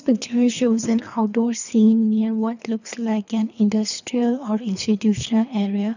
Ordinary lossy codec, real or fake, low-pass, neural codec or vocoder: none; fake; 7.2 kHz; codec, 24 kHz, 3 kbps, HILCodec